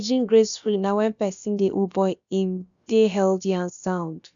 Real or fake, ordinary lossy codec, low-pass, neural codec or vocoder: fake; none; 7.2 kHz; codec, 16 kHz, about 1 kbps, DyCAST, with the encoder's durations